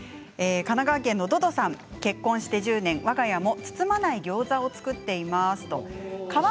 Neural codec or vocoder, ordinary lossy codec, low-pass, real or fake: none; none; none; real